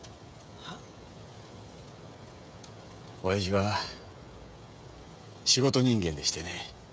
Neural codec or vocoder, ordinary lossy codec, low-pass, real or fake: codec, 16 kHz, 16 kbps, FreqCodec, smaller model; none; none; fake